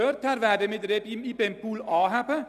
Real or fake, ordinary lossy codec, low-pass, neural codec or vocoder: real; none; 14.4 kHz; none